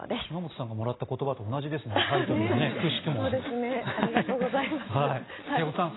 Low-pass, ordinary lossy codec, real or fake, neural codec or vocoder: 7.2 kHz; AAC, 16 kbps; real; none